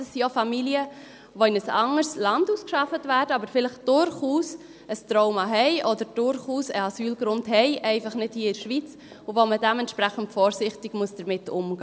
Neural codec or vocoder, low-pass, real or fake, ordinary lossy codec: none; none; real; none